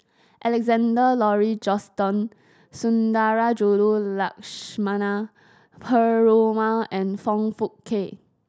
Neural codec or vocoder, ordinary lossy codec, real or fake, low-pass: none; none; real; none